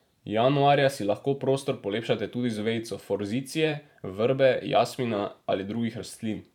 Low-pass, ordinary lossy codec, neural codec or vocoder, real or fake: 19.8 kHz; none; vocoder, 44.1 kHz, 128 mel bands every 512 samples, BigVGAN v2; fake